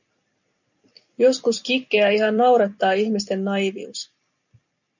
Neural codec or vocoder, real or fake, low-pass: vocoder, 44.1 kHz, 128 mel bands every 256 samples, BigVGAN v2; fake; 7.2 kHz